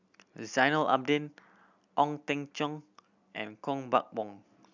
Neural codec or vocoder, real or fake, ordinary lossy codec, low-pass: none; real; none; 7.2 kHz